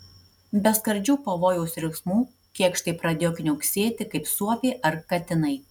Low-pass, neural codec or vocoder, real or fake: 19.8 kHz; none; real